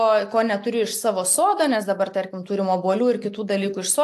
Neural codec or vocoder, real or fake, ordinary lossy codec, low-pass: none; real; AAC, 64 kbps; 14.4 kHz